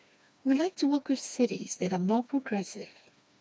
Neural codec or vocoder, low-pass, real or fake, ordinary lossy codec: codec, 16 kHz, 2 kbps, FreqCodec, smaller model; none; fake; none